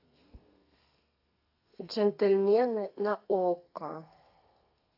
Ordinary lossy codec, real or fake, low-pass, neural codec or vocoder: AAC, 32 kbps; fake; 5.4 kHz; codec, 32 kHz, 1.9 kbps, SNAC